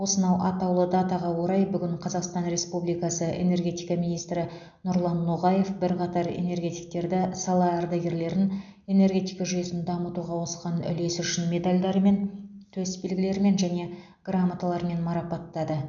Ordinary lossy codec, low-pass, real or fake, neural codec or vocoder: none; 7.2 kHz; real; none